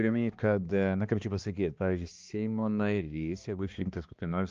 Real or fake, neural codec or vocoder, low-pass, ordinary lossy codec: fake; codec, 16 kHz, 2 kbps, X-Codec, HuBERT features, trained on balanced general audio; 7.2 kHz; Opus, 24 kbps